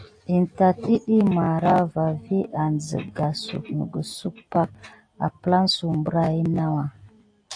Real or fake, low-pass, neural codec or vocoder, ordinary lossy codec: real; 9.9 kHz; none; AAC, 48 kbps